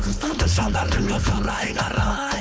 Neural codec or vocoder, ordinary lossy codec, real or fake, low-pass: codec, 16 kHz, 4.8 kbps, FACodec; none; fake; none